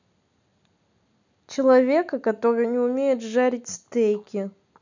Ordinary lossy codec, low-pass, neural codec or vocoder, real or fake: none; 7.2 kHz; none; real